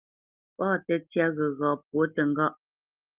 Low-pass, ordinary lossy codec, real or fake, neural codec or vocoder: 3.6 kHz; Opus, 24 kbps; real; none